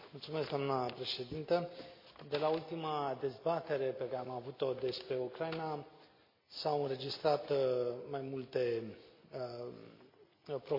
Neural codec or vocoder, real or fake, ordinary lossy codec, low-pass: none; real; MP3, 32 kbps; 5.4 kHz